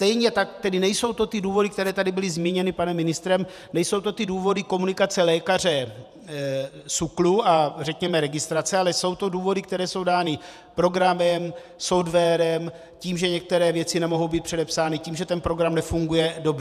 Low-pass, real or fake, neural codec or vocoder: 14.4 kHz; fake; vocoder, 48 kHz, 128 mel bands, Vocos